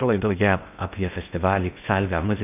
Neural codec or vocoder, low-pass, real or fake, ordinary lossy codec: codec, 16 kHz in and 24 kHz out, 0.6 kbps, FocalCodec, streaming, 2048 codes; 3.6 kHz; fake; AAC, 32 kbps